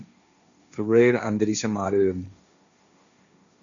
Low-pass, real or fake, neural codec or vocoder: 7.2 kHz; fake; codec, 16 kHz, 1.1 kbps, Voila-Tokenizer